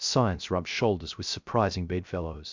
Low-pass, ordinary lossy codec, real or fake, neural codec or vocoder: 7.2 kHz; MP3, 64 kbps; fake; codec, 16 kHz, 0.3 kbps, FocalCodec